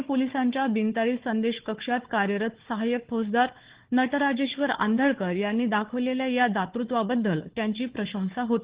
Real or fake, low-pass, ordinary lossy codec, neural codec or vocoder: fake; 3.6 kHz; Opus, 16 kbps; codec, 16 kHz, 4 kbps, FunCodec, trained on Chinese and English, 50 frames a second